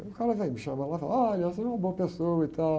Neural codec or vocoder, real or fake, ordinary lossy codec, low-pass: none; real; none; none